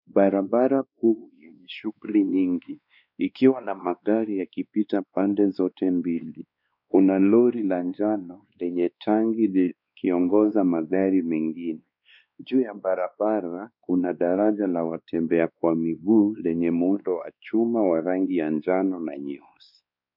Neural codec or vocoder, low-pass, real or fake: codec, 16 kHz, 2 kbps, X-Codec, WavLM features, trained on Multilingual LibriSpeech; 5.4 kHz; fake